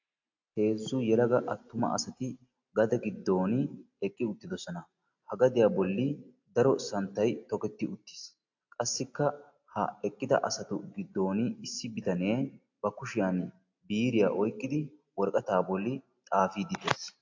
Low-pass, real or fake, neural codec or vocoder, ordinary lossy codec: 7.2 kHz; real; none; AAC, 48 kbps